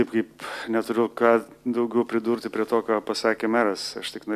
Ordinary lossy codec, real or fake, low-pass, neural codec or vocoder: MP3, 96 kbps; real; 14.4 kHz; none